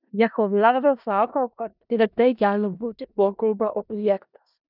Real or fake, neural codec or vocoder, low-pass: fake; codec, 16 kHz in and 24 kHz out, 0.4 kbps, LongCat-Audio-Codec, four codebook decoder; 5.4 kHz